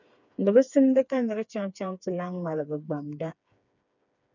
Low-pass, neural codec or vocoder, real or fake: 7.2 kHz; codec, 16 kHz, 4 kbps, FreqCodec, smaller model; fake